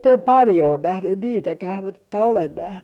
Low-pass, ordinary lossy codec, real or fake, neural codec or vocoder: 19.8 kHz; none; fake; codec, 44.1 kHz, 2.6 kbps, DAC